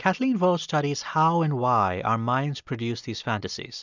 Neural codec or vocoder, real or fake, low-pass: none; real; 7.2 kHz